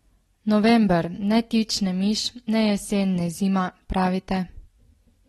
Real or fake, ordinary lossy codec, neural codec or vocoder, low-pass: real; AAC, 32 kbps; none; 19.8 kHz